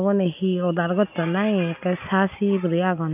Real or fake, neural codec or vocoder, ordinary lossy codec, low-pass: real; none; none; 3.6 kHz